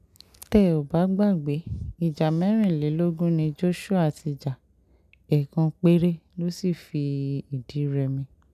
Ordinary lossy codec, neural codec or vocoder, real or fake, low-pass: none; none; real; 14.4 kHz